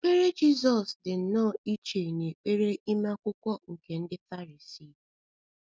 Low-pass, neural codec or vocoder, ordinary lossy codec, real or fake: none; none; none; real